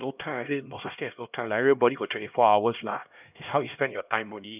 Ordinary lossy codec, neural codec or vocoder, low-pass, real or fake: none; codec, 16 kHz, 1 kbps, X-Codec, HuBERT features, trained on LibriSpeech; 3.6 kHz; fake